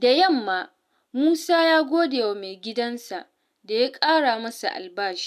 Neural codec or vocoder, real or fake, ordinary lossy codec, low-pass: none; real; none; 14.4 kHz